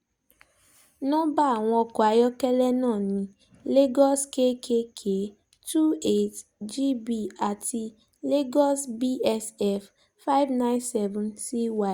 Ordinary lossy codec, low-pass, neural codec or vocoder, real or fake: Opus, 64 kbps; 19.8 kHz; none; real